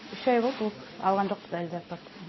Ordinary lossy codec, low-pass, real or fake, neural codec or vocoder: MP3, 24 kbps; 7.2 kHz; real; none